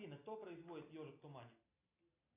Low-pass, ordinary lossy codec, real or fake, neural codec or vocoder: 3.6 kHz; AAC, 32 kbps; real; none